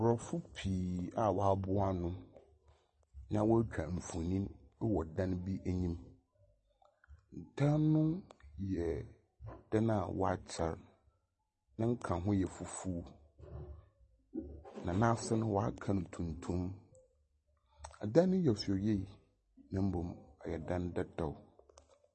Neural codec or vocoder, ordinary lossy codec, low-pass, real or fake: none; MP3, 32 kbps; 10.8 kHz; real